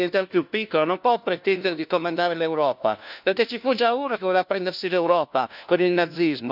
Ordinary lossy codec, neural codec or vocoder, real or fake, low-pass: none; codec, 16 kHz, 1 kbps, FunCodec, trained on LibriTTS, 50 frames a second; fake; 5.4 kHz